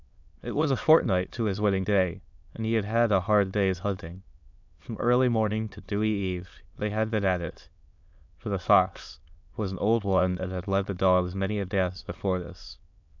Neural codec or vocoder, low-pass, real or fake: autoencoder, 22.05 kHz, a latent of 192 numbers a frame, VITS, trained on many speakers; 7.2 kHz; fake